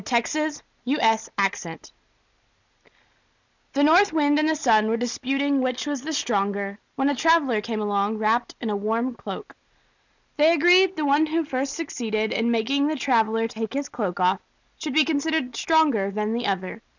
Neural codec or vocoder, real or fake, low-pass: none; real; 7.2 kHz